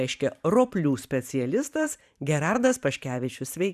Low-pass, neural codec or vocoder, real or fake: 14.4 kHz; vocoder, 44.1 kHz, 128 mel bands every 512 samples, BigVGAN v2; fake